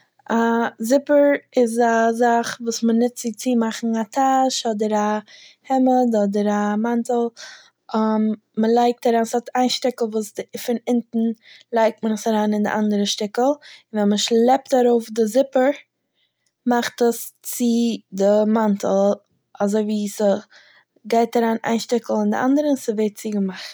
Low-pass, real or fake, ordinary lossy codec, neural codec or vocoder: none; real; none; none